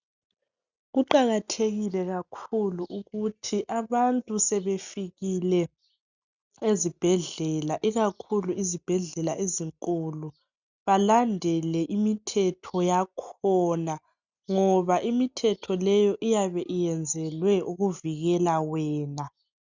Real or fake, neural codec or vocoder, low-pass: real; none; 7.2 kHz